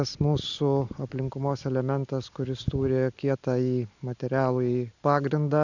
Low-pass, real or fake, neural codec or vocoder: 7.2 kHz; real; none